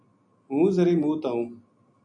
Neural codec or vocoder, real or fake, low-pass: none; real; 9.9 kHz